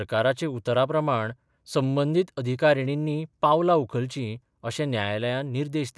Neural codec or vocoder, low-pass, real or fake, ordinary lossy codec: none; none; real; none